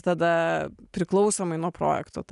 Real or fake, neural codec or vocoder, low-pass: real; none; 10.8 kHz